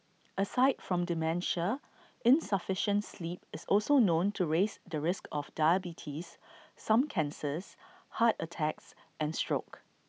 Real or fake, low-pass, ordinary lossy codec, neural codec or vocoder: real; none; none; none